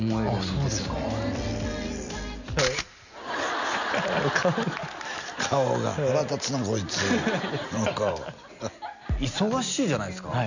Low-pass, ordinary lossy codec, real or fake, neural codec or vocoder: 7.2 kHz; none; real; none